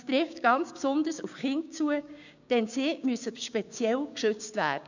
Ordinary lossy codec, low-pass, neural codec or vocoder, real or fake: none; 7.2 kHz; codec, 16 kHz, 6 kbps, DAC; fake